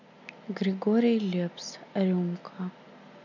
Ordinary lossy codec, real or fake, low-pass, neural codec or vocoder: none; real; 7.2 kHz; none